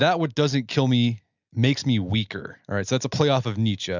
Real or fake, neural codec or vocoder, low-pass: real; none; 7.2 kHz